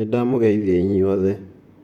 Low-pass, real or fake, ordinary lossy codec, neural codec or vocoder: 19.8 kHz; fake; none; vocoder, 44.1 kHz, 128 mel bands, Pupu-Vocoder